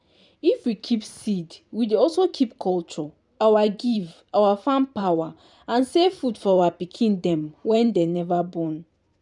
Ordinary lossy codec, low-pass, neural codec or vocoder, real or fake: none; 10.8 kHz; vocoder, 44.1 kHz, 128 mel bands every 512 samples, BigVGAN v2; fake